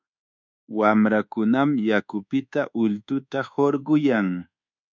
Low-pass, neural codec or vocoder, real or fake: 7.2 kHz; codec, 24 kHz, 1.2 kbps, DualCodec; fake